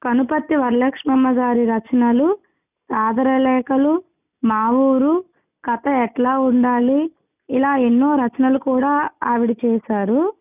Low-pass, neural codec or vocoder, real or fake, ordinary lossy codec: 3.6 kHz; none; real; none